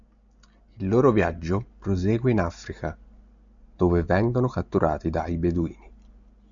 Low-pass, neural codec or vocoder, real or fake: 7.2 kHz; none; real